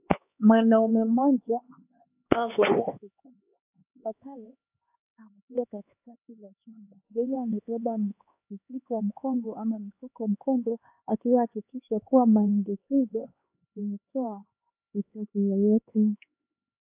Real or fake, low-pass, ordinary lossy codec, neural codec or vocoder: fake; 3.6 kHz; AAC, 32 kbps; codec, 16 kHz, 4 kbps, X-Codec, HuBERT features, trained on LibriSpeech